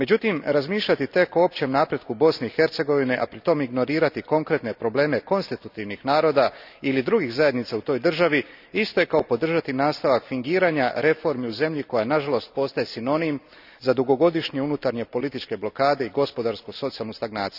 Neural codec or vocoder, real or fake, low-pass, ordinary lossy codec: none; real; 5.4 kHz; none